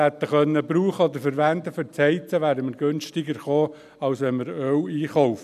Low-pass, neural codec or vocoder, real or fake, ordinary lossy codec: 14.4 kHz; none; real; none